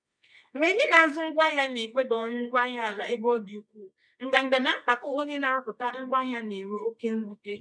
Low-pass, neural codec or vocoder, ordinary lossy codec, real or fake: 9.9 kHz; codec, 24 kHz, 0.9 kbps, WavTokenizer, medium music audio release; none; fake